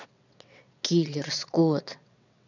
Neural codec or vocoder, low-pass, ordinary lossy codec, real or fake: vocoder, 22.05 kHz, 80 mel bands, WaveNeXt; 7.2 kHz; none; fake